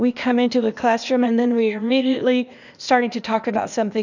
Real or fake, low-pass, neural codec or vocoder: fake; 7.2 kHz; codec, 16 kHz, 0.8 kbps, ZipCodec